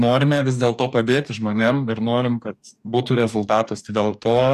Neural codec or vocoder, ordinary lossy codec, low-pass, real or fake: codec, 44.1 kHz, 2.6 kbps, DAC; AAC, 96 kbps; 14.4 kHz; fake